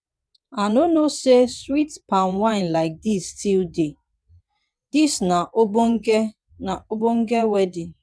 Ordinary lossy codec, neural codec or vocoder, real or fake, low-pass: none; vocoder, 22.05 kHz, 80 mel bands, WaveNeXt; fake; none